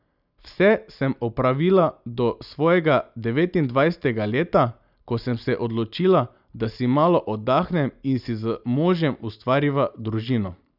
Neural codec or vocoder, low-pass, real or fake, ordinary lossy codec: none; 5.4 kHz; real; none